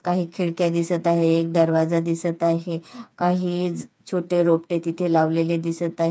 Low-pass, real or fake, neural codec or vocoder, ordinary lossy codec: none; fake; codec, 16 kHz, 4 kbps, FreqCodec, smaller model; none